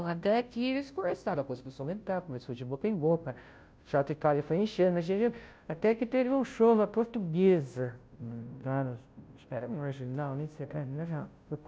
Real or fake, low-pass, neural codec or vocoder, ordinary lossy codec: fake; none; codec, 16 kHz, 0.5 kbps, FunCodec, trained on Chinese and English, 25 frames a second; none